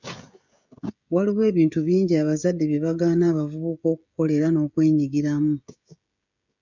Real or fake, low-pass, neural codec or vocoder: fake; 7.2 kHz; codec, 16 kHz, 16 kbps, FreqCodec, smaller model